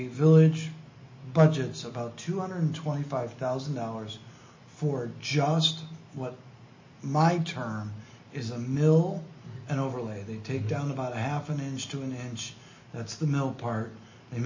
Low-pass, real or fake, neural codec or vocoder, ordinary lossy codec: 7.2 kHz; real; none; MP3, 32 kbps